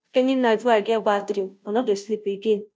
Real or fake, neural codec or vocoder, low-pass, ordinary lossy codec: fake; codec, 16 kHz, 0.5 kbps, FunCodec, trained on Chinese and English, 25 frames a second; none; none